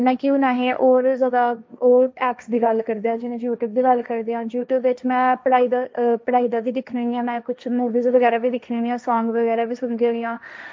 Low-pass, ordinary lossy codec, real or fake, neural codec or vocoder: none; none; fake; codec, 16 kHz, 1.1 kbps, Voila-Tokenizer